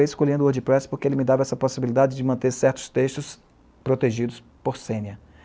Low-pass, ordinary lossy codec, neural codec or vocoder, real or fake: none; none; none; real